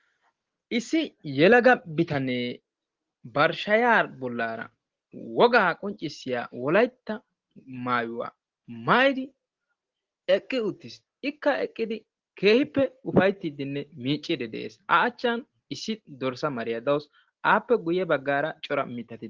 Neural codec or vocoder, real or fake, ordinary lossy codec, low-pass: none; real; Opus, 16 kbps; 7.2 kHz